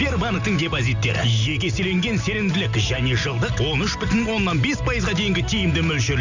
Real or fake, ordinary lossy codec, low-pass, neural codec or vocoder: real; none; 7.2 kHz; none